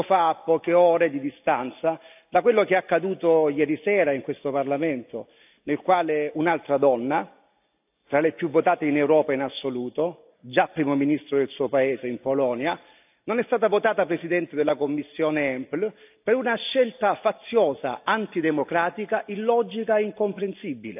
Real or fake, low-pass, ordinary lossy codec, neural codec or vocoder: real; 3.6 kHz; AAC, 32 kbps; none